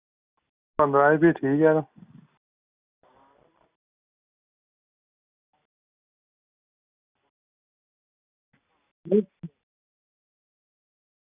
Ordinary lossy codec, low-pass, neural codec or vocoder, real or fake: Opus, 64 kbps; 3.6 kHz; none; real